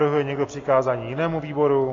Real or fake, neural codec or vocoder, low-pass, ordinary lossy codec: real; none; 7.2 kHz; AAC, 32 kbps